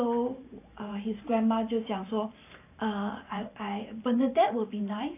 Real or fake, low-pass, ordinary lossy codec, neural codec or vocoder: fake; 3.6 kHz; AAC, 24 kbps; vocoder, 44.1 kHz, 128 mel bands every 512 samples, BigVGAN v2